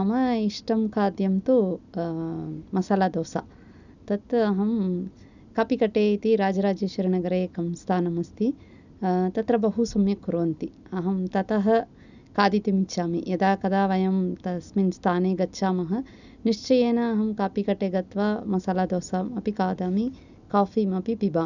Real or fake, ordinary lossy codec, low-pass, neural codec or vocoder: real; none; 7.2 kHz; none